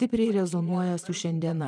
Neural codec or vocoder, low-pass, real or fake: vocoder, 22.05 kHz, 80 mel bands, Vocos; 9.9 kHz; fake